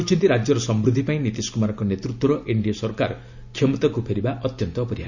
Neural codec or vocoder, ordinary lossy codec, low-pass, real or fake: none; none; 7.2 kHz; real